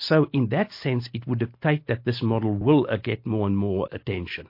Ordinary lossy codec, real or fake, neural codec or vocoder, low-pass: MP3, 32 kbps; real; none; 5.4 kHz